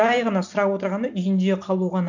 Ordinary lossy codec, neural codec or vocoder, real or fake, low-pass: none; none; real; 7.2 kHz